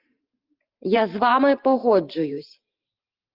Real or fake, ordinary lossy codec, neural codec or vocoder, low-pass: fake; Opus, 24 kbps; vocoder, 44.1 kHz, 128 mel bands every 512 samples, BigVGAN v2; 5.4 kHz